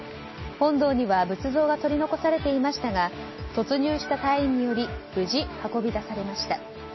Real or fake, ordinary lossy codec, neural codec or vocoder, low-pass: real; MP3, 24 kbps; none; 7.2 kHz